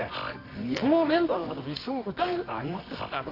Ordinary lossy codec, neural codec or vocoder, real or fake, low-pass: AAC, 32 kbps; codec, 24 kHz, 0.9 kbps, WavTokenizer, medium music audio release; fake; 5.4 kHz